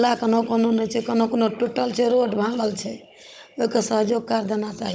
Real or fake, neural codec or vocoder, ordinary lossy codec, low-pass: fake; codec, 16 kHz, 16 kbps, FunCodec, trained on Chinese and English, 50 frames a second; none; none